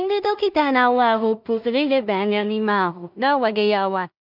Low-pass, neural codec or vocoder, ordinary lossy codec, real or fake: 5.4 kHz; codec, 16 kHz in and 24 kHz out, 0.4 kbps, LongCat-Audio-Codec, two codebook decoder; none; fake